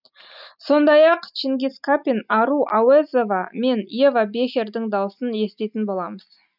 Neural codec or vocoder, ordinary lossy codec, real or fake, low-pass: none; none; real; 5.4 kHz